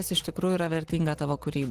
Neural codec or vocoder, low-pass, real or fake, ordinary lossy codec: none; 14.4 kHz; real; Opus, 16 kbps